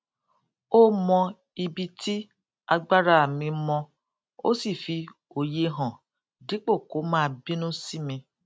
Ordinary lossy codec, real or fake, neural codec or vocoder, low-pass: none; real; none; none